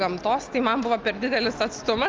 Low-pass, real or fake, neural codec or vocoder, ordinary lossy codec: 7.2 kHz; real; none; Opus, 32 kbps